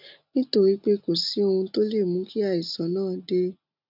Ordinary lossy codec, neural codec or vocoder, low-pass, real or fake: none; none; 5.4 kHz; real